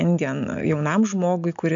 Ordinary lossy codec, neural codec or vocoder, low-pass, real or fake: MP3, 64 kbps; none; 7.2 kHz; real